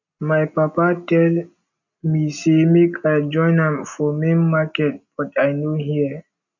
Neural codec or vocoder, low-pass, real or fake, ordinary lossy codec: none; 7.2 kHz; real; none